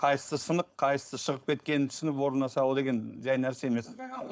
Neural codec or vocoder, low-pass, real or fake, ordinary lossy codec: codec, 16 kHz, 4.8 kbps, FACodec; none; fake; none